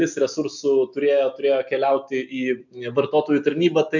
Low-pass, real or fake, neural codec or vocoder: 7.2 kHz; real; none